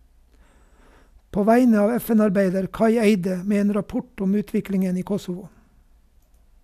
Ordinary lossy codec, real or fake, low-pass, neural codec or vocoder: none; real; 14.4 kHz; none